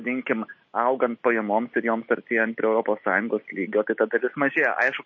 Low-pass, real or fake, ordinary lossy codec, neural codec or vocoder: 7.2 kHz; real; MP3, 32 kbps; none